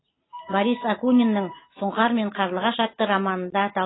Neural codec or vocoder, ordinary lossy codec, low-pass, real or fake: none; AAC, 16 kbps; 7.2 kHz; real